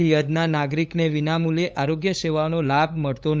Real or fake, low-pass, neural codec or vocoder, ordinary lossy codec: fake; none; codec, 16 kHz, 8 kbps, FunCodec, trained on LibriTTS, 25 frames a second; none